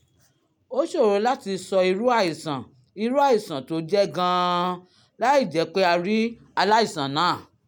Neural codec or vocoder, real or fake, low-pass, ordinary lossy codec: none; real; 19.8 kHz; none